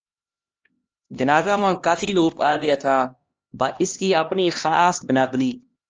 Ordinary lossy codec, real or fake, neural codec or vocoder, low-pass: Opus, 24 kbps; fake; codec, 16 kHz, 1 kbps, X-Codec, HuBERT features, trained on LibriSpeech; 7.2 kHz